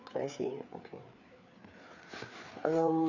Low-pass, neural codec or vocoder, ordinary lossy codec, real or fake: 7.2 kHz; codec, 16 kHz, 4 kbps, FreqCodec, larger model; none; fake